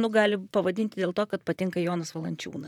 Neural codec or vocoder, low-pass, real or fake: vocoder, 44.1 kHz, 128 mel bands, Pupu-Vocoder; 19.8 kHz; fake